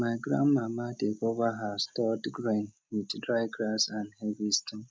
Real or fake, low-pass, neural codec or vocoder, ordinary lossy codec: real; none; none; none